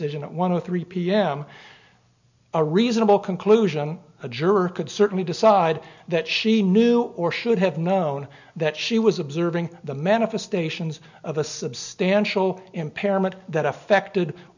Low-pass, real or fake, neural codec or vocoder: 7.2 kHz; real; none